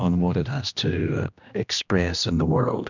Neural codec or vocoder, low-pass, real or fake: codec, 16 kHz, 1 kbps, X-Codec, HuBERT features, trained on general audio; 7.2 kHz; fake